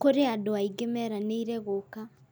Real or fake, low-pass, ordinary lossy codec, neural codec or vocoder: real; none; none; none